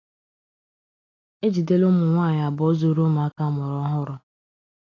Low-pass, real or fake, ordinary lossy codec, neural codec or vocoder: 7.2 kHz; real; AAC, 32 kbps; none